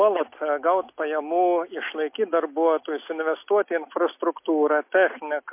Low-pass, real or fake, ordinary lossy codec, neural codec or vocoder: 3.6 kHz; real; MP3, 24 kbps; none